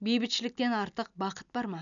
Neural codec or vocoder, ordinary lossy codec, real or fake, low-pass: none; none; real; 7.2 kHz